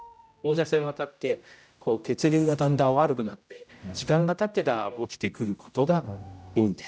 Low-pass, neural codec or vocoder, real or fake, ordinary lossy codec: none; codec, 16 kHz, 0.5 kbps, X-Codec, HuBERT features, trained on general audio; fake; none